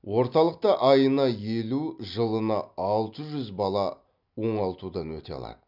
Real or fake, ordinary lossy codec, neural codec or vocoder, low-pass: real; none; none; 5.4 kHz